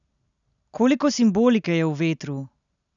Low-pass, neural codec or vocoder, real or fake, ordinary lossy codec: 7.2 kHz; none; real; none